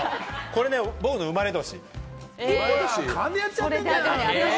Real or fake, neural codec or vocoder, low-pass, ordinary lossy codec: real; none; none; none